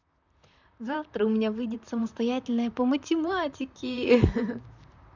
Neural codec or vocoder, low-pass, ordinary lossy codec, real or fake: vocoder, 44.1 kHz, 128 mel bands, Pupu-Vocoder; 7.2 kHz; none; fake